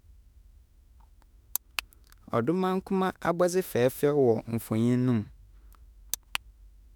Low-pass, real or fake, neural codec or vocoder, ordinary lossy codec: none; fake; autoencoder, 48 kHz, 32 numbers a frame, DAC-VAE, trained on Japanese speech; none